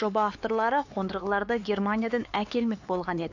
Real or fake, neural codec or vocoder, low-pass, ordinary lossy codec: fake; codec, 16 kHz, 4 kbps, X-Codec, HuBERT features, trained on LibriSpeech; 7.2 kHz; AAC, 48 kbps